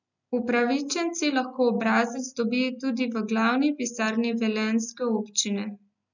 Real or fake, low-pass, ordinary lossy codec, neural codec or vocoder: real; 7.2 kHz; none; none